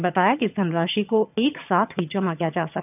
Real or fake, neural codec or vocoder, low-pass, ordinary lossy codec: fake; vocoder, 22.05 kHz, 80 mel bands, HiFi-GAN; 3.6 kHz; none